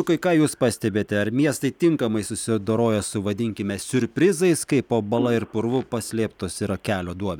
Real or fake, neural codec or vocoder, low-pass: real; none; 19.8 kHz